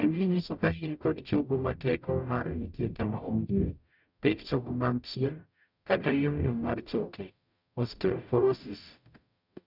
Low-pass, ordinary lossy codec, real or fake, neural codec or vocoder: 5.4 kHz; none; fake; codec, 44.1 kHz, 0.9 kbps, DAC